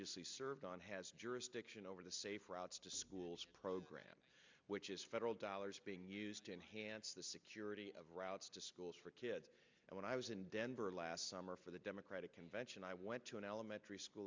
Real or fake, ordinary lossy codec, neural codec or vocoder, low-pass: real; MP3, 64 kbps; none; 7.2 kHz